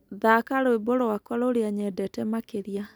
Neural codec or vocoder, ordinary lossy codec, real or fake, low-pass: none; none; real; none